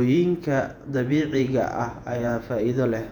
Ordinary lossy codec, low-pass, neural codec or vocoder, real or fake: none; 19.8 kHz; vocoder, 48 kHz, 128 mel bands, Vocos; fake